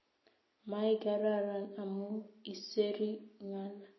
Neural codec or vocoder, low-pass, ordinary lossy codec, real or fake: none; 7.2 kHz; MP3, 24 kbps; real